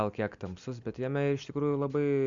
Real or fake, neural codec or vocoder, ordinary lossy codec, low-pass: real; none; Opus, 64 kbps; 7.2 kHz